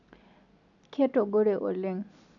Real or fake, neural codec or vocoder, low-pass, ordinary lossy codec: real; none; 7.2 kHz; none